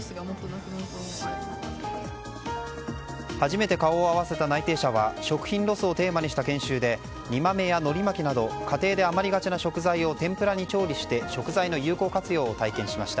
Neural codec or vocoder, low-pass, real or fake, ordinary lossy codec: none; none; real; none